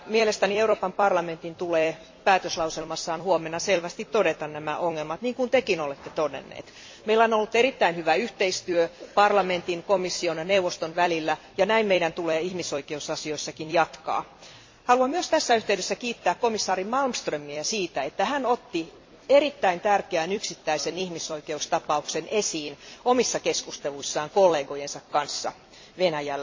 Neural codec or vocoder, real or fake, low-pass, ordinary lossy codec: vocoder, 44.1 kHz, 128 mel bands every 256 samples, BigVGAN v2; fake; 7.2 kHz; MP3, 32 kbps